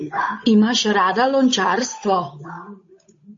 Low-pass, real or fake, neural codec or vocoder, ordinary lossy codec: 7.2 kHz; fake; codec, 16 kHz, 8 kbps, FunCodec, trained on Chinese and English, 25 frames a second; MP3, 32 kbps